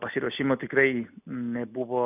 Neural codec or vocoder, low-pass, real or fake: none; 3.6 kHz; real